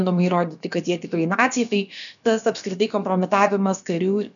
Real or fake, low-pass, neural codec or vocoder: fake; 7.2 kHz; codec, 16 kHz, about 1 kbps, DyCAST, with the encoder's durations